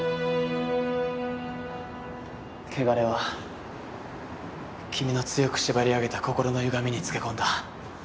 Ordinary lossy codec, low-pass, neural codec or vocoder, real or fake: none; none; none; real